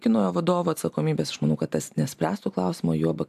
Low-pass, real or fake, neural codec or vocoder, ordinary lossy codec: 14.4 kHz; real; none; AAC, 96 kbps